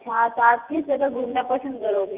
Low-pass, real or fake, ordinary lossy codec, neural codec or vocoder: 3.6 kHz; fake; Opus, 32 kbps; vocoder, 24 kHz, 100 mel bands, Vocos